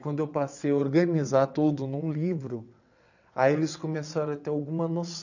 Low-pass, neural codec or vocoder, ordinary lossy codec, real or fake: 7.2 kHz; vocoder, 22.05 kHz, 80 mel bands, WaveNeXt; none; fake